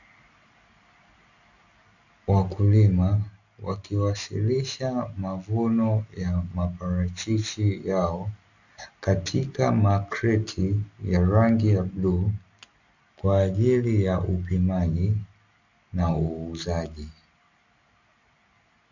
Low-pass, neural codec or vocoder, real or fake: 7.2 kHz; none; real